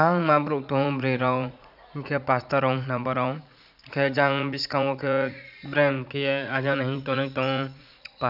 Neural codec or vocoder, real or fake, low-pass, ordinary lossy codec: vocoder, 44.1 kHz, 80 mel bands, Vocos; fake; 5.4 kHz; none